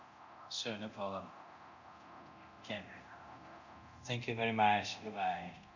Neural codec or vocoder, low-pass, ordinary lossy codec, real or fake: codec, 24 kHz, 0.9 kbps, DualCodec; 7.2 kHz; none; fake